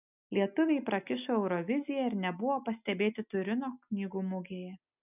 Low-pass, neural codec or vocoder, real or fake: 3.6 kHz; none; real